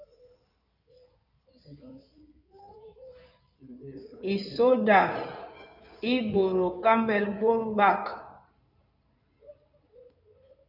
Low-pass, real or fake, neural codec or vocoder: 5.4 kHz; fake; codec, 16 kHz in and 24 kHz out, 2.2 kbps, FireRedTTS-2 codec